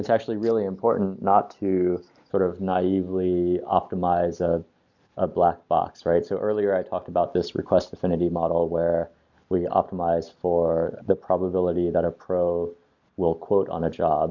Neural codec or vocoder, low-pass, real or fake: none; 7.2 kHz; real